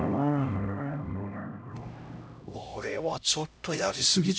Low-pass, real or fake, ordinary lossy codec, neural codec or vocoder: none; fake; none; codec, 16 kHz, 0.5 kbps, X-Codec, HuBERT features, trained on LibriSpeech